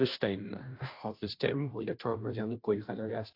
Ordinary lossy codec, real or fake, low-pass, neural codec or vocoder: AAC, 32 kbps; fake; 5.4 kHz; codec, 16 kHz, 0.5 kbps, FunCodec, trained on Chinese and English, 25 frames a second